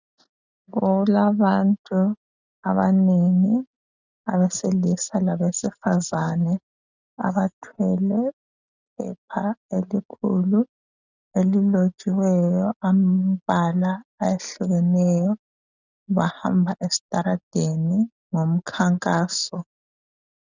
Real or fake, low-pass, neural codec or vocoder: real; 7.2 kHz; none